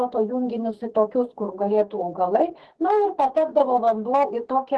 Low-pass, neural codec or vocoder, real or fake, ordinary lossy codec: 10.8 kHz; codec, 44.1 kHz, 2.6 kbps, SNAC; fake; Opus, 16 kbps